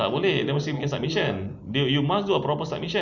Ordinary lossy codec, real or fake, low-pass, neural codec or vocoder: none; real; 7.2 kHz; none